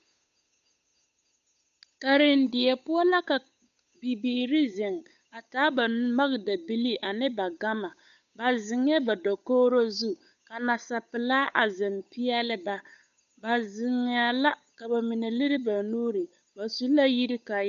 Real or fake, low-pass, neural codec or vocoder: fake; 7.2 kHz; codec, 16 kHz, 8 kbps, FunCodec, trained on Chinese and English, 25 frames a second